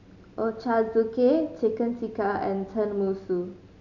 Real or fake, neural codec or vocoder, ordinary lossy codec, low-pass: real; none; none; 7.2 kHz